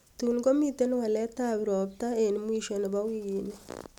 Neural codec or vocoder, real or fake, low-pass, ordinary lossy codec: none; real; 19.8 kHz; none